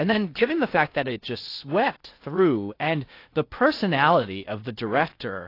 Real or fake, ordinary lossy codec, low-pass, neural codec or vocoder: fake; AAC, 32 kbps; 5.4 kHz; codec, 16 kHz in and 24 kHz out, 0.6 kbps, FocalCodec, streaming, 2048 codes